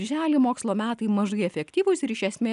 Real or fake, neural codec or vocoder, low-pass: real; none; 10.8 kHz